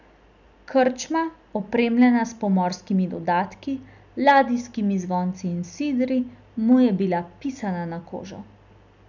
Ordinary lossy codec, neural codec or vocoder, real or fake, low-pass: none; none; real; 7.2 kHz